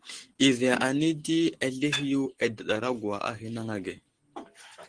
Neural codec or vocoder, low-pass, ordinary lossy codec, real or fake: none; 9.9 kHz; Opus, 16 kbps; real